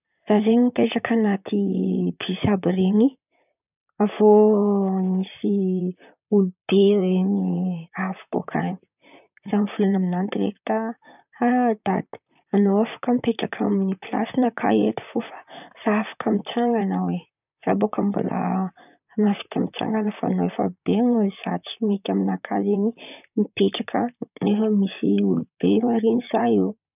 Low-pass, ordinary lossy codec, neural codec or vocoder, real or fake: 3.6 kHz; none; vocoder, 44.1 kHz, 128 mel bands, Pupu-Vocoder; fake